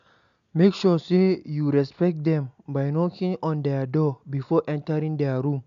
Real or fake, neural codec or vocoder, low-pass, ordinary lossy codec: real; none; 7.2 kHz; AAC, 96 kbps